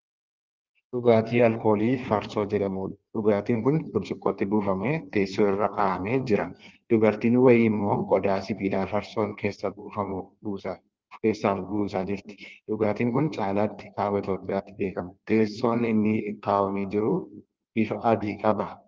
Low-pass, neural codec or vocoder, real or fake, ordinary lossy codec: 7.2 kHz; codec, 16 kHz in and 24 kHz out, 1.1 kbps, FireRedTTS-2 codec; fake; Opus, 32 kbps